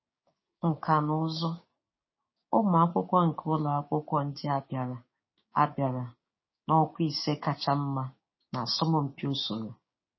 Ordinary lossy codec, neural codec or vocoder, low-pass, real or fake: MP3, 24 kbps; codec, 16 kHz, 6 kbps, DAC; 7.2 kHz; fake